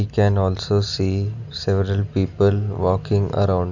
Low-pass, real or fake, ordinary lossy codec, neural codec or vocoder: 7.2 kHz; real; none; none